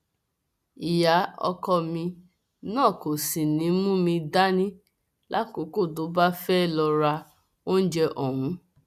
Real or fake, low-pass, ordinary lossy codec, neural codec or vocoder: real; 14.4 kHz; none; none